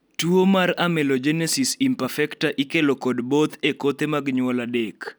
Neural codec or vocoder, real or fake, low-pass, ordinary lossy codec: none; real; none; none